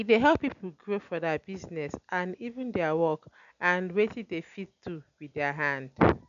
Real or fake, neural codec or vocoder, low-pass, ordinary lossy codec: real; none; 7.2 kHz; none